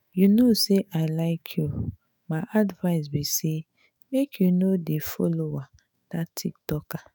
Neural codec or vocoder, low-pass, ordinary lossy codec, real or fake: autoencoder, 48 kHz, 128 numbers a frame, DAC-VAE, trained on Japanese speech; none; none; fake